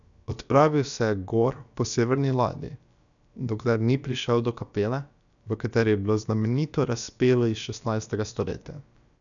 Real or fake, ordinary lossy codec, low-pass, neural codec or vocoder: fake; none; 7.2 kHz; codec, 16 kHz, about 1 kbps, DyCAST, with the encoder's durations